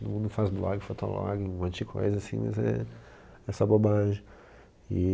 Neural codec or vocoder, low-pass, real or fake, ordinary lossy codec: none; none; real; none